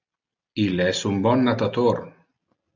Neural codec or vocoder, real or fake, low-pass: none; real; 7.2 kHz